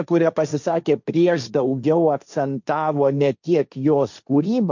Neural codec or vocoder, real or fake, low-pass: codec, 16 kHz, 1.1 kbps, Voila-Tokenizer; fake; 7.2 kHz